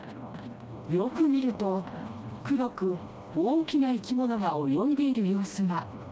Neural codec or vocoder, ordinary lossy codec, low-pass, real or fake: codec, 16 kHz, 1 kbps, FreqCodec, smaller model; none; none; fake